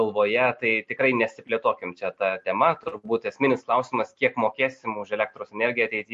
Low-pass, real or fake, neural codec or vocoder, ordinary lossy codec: 14.4 kHz; real; none; MP3, 48 kbps